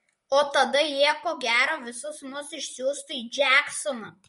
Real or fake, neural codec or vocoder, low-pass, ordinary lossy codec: fake; vocoder, 44.1 kHz, 128 mel bands, Pupu-Vocoder; 19.8 kHz; MP3, 48 kbps